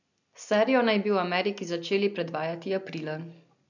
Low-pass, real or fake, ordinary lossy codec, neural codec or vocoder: 7.2 kHz; real; none; none